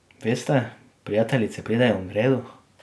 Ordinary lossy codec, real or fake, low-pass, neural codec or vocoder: none; real; none; none